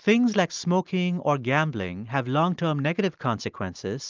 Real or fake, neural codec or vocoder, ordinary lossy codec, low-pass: real; none; Opus, 24 kbps; 7.2 kHz